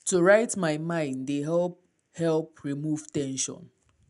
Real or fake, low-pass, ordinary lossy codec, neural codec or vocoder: real; 10.8 kHz; none; none